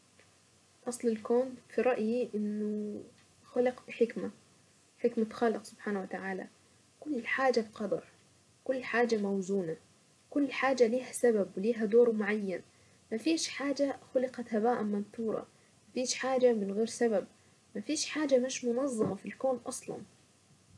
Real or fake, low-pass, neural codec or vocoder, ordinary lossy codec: fake; none; vocoder, 24 kHz, 100 mel bands, Vocos; none